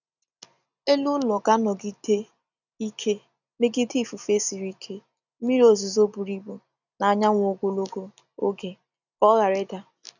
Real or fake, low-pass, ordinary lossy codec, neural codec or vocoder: real; 7.2 kHz; none; none